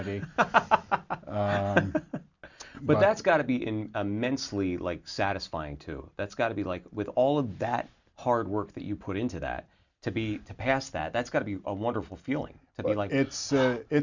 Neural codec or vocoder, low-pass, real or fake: none; 7.2 kHz; real